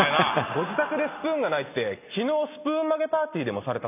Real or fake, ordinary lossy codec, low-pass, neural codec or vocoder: real; none; 3.6 kHz; none